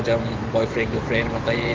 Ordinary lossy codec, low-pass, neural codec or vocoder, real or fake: Opus, 16 kbps; 7.2 kHz; autoencoder, 48 kHz, 128 numbers a frame, DAC-VAE, trained on Japanese speech; fake